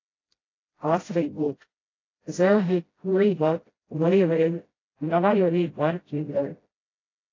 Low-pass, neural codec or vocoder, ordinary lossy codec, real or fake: 7.2 kHz; codec, 16 kHz, 0.5 kbps, FreqCodec, smaller model; AAC, 32 kbps; fake